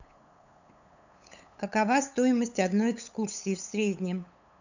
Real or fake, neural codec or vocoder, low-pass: fake; codec, 16 kHz, 8 kbps, FunCodec, trained on LibriTTS, 25 frames a second; 7.2 kHz